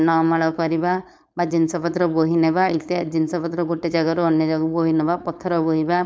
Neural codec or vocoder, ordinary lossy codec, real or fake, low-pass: codec, 16 kHz, 4.8 kbps, FACodec; none; fake; none